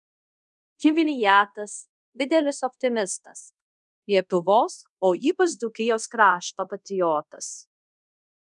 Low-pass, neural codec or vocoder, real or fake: 10.8 kHz; codec, 24 kHz, 0.5 kbps, DualCodec; fake